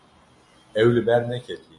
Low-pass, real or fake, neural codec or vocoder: 10.8 kHz; real; none